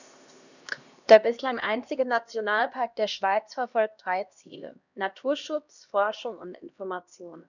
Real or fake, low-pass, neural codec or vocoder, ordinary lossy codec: fake; 7.2 kHz; codec, 16 kHz, 1 kbps, X-Codec, HuBERT features, trained on LibriSpeech; none